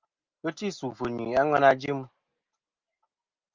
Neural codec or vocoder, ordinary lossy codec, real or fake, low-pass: none; Opus, 24 kbps; real; 7.2 kHz